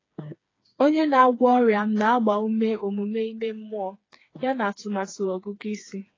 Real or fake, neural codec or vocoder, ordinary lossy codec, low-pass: fake; codec, 16 kHz, 4 kbps, FreqCodec, smaller model; AAC, 32 kbps; 7.2 kHz